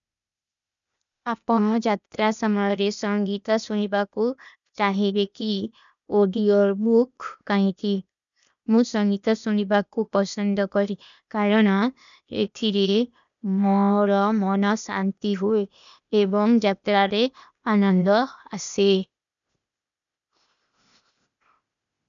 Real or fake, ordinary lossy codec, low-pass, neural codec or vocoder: fake; none; 7.2 kHz; codec, 16 kHz, 0.8 kbps, ZipCodec